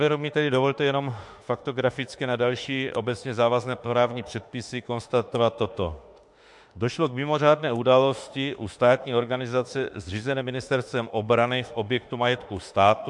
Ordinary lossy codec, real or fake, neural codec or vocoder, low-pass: MP3, 64 kbps; fake; autoencoder, 48 kHz, 32 numbers a frame, DAC-VAE, trained on Japanese speech; 10.8 kHz